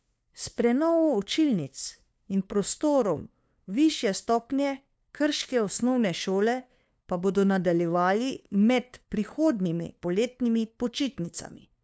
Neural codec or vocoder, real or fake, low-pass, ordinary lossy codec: codec, 16 kHz, 2 kbps, FunCodec, trained on LibriTTS, 25 frames a second; fake; none; none